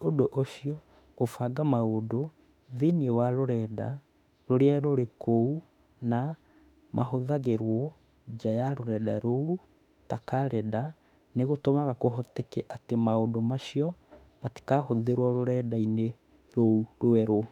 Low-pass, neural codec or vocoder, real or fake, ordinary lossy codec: 19.8 kHz; autoencoder, 48 kHz, 32 numbers a frame, DAC-VAE, trained on Japanese speech; fake; none